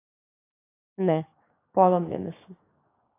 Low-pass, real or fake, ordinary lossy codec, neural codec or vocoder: 3.6 kHz; fake; AAC, 16 kbps; codec, 16 kHz, 6 kbps, DAC